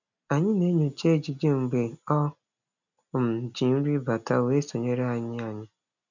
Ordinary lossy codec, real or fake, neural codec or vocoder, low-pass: none; real; none; 7.2 kHz